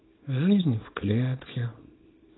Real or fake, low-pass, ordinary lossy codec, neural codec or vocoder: fake; 7.2 kHz; AAC, 16 kbps; codec, 24 kHz, 0.9 kbps, WavTokenizer, small release